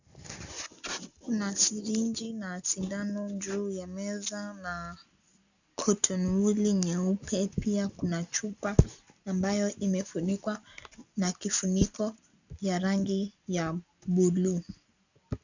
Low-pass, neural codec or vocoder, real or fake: 7.2 kHz; none; real